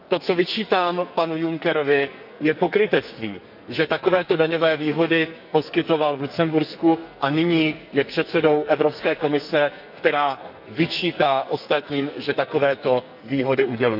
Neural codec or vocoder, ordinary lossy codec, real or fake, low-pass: codec, 44.1 kHz, 2.6 kbps, SNAC; none; fake; 5.4 kHz